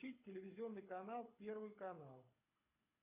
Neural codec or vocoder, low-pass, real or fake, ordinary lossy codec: codec, 24 kHz, 6 kbps, HILCodec; 3.6 kHz; fake; MP3, 32 kbps